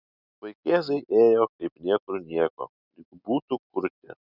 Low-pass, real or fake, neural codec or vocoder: 5.4 kHz; real; none